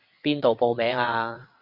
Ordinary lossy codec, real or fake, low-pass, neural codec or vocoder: Opus, 64 kbps; fake; 5.4 kHz; vocoder, 22.05 kHz, 80 mel bands, WaveNeXt